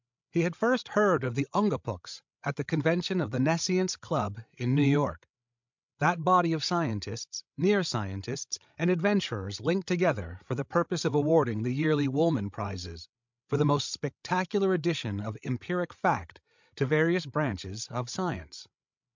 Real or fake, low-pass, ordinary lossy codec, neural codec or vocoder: fake; 7.2 kHz; MP3, 64 kbps; codec, 16 kHz, 16 kbps, FreqCodec, larger model